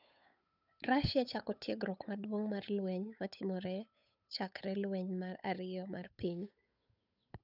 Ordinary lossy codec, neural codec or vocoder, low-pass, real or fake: none; codec, 16 kHz, 8 kbps, FunCodec, trained on Chinese and English, 25 frames a second; 5.4 kHz; fake